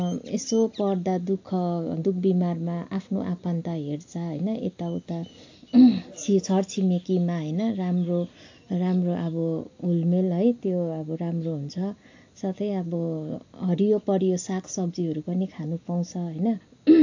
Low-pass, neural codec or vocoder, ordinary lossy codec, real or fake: 7.2 kHz; none; AAC, 48 kbps; real